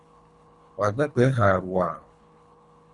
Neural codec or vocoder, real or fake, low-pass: codec, 24 kHz, 3 kbps, HILCodec; fake; 10.8 kHz